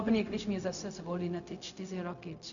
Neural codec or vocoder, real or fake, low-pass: codec, 16 kHz, 0.4 kbps, LongCat-Audio-Codec; fake; 7.2 kHz